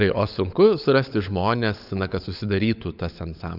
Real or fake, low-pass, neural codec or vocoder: fake; 5.4 kHz; codec, 16 kHz, 16 kbps, FunCodec, trained on LibriTTS, 50 frames a second